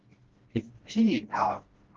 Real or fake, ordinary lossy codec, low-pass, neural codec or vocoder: fake; Opus, 16 kbps; 7.2 kHz; codec, 16 kHz, 1 kbps, FreqCodec, smaller model